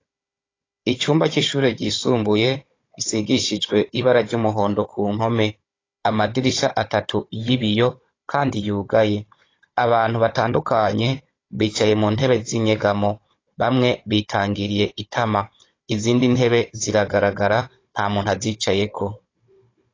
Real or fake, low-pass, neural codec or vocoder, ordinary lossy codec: fake; 7.2 kHz; codec, 16 kHz, 16 kbps, FunCodec, trained on Chinese and English, 50 frames a second; AAC, 32 kbps